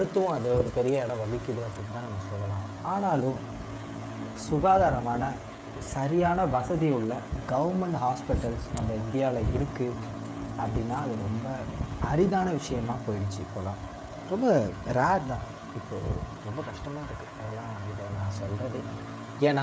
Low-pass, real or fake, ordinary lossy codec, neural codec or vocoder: none; fake; none; codec, 16 kHz, 16 kbps, FreqCodec, smaller model